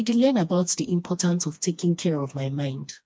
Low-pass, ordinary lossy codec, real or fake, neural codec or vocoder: none; none; fake; codec, 16 kHz, 2 kbps, FreqCodec, smaller model